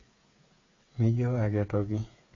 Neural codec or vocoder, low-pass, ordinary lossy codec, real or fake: codec, 16 kHz, 8 kbps, FreqCodec, smaller model; 7.2 kHz; AAC, 32 kbps; fake